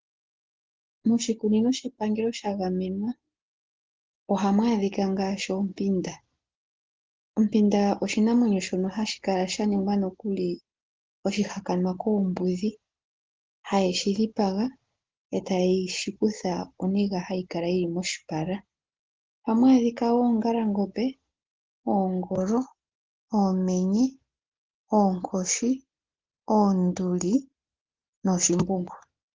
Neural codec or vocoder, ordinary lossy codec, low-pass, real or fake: none; Opus, 16 kbps; 7.2 kHz; real